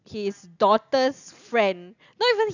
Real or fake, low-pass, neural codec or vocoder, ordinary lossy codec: real; 7.2 kHz; none; none